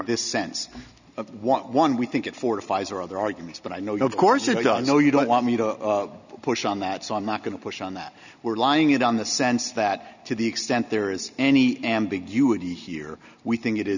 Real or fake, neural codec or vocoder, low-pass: real; none; 7.2 kHz